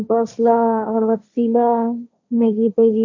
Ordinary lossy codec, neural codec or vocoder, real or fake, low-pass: none; codec, 16 kHz, 1.1 kbps, Voila-Tokenizer; fake; 7.2 kHz